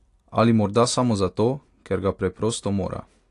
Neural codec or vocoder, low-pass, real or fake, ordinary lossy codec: none; 10.8 kHz; real; AAC, 48 kbps